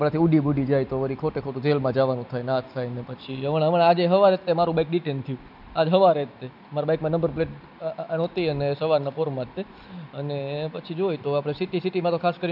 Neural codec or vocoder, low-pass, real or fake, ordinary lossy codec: none; 5.4 kHz; real; none